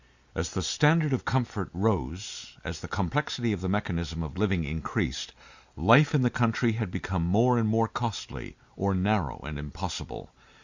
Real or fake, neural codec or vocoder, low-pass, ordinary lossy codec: real; none; 7.2 kHz; Opus, 64 kbps